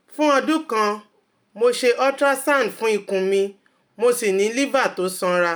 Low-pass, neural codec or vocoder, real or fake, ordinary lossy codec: none; none; real; none